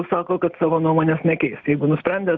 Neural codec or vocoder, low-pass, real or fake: none; 7.2 kHz; real